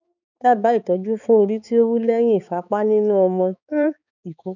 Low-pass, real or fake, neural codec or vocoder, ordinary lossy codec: 7.2 kHz; fake; codec, 16 kHz, 4 kbps, X-Codec, HuBERT features, trained on balanced general audio; none